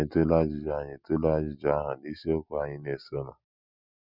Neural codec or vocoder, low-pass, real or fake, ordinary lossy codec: none; 5.4 kHz; real; none